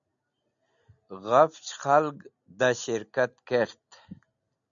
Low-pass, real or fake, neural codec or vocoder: 7.2 kHz; real; none